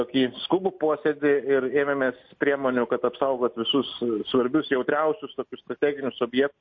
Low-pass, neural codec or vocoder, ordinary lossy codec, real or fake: 7.2 kHz; none; MP3, 32 kbps; real